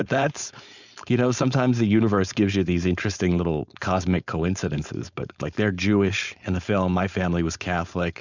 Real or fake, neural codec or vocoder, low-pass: fake; codec, 16 kHz, 4.8 kbps, FACodec; 7.2 kHz